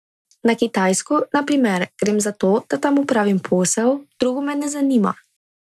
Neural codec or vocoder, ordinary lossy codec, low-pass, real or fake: none; none; none; real